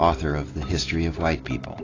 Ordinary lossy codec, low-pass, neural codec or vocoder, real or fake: AAC, 32 kbps; 7.2 kHz; none; real